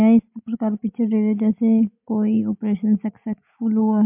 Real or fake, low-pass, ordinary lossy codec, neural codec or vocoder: real; 3.6 kHz; MP3, 32 kbps; none